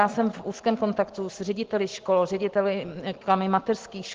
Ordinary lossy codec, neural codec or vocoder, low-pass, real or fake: Opus, 16 kbps; none; 7.2 kHz; real